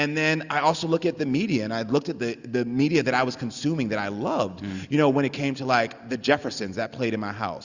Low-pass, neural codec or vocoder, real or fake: 7.2 kHz; none; real